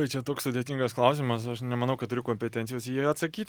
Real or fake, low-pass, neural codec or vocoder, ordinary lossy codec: real; 19.8 kHz; none; Opus, 24 kbps